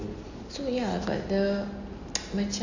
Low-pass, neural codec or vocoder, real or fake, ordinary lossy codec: 7.2 kHz; none; real; none